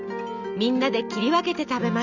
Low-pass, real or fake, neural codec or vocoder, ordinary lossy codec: 7.2 kHz; real; none; none